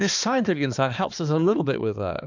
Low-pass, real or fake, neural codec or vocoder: 7.2 kHz; fake; codec, 16 kHz, 2 kbps, X-Codec, HuBERT features, trained on balanced general audio